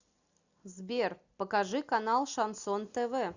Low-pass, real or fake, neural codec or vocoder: 7.2 kHz; real; none